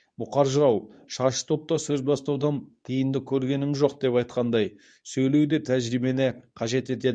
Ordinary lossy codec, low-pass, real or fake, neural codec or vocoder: none; 9.9 kHz; fake; codec, 24 kHz, 0.9 kbps, WavTokenizer, medium speech release version 2